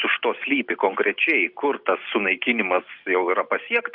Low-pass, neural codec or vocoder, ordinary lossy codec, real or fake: 5.4 kHz; none; Opus, 24 kbps; real